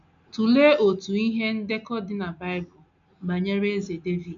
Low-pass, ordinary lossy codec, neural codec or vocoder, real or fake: 7.2 kHz; none; none; real